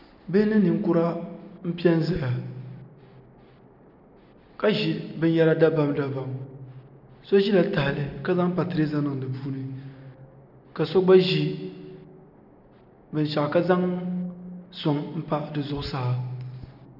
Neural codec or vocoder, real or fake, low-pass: none; real; 5.4 kHz